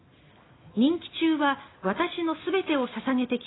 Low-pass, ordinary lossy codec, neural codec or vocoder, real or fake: 7.2 kHz; AAC, 16 kbps; none; real